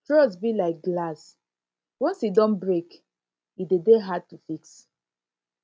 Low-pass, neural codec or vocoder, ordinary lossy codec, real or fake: none; none; none; real